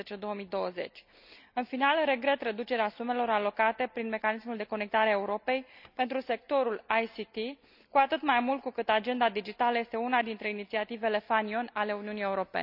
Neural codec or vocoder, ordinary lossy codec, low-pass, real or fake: none; none; 5.4 kHz; real